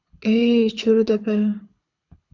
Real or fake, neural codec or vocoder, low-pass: fake; codec, 24 kHz, 6 kbps, HILCodec; 7.2 kHz